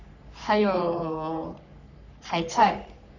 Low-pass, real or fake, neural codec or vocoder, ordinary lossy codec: 7.2 kHz; fake; codec, 44.1 kHz, 3.4 kbps, Pupu-Codec; none